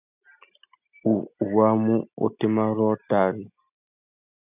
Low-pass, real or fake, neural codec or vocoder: 3.6 kHz; real; none